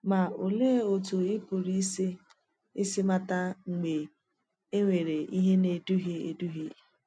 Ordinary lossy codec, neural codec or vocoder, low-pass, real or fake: none; none; 9.9 kHz; real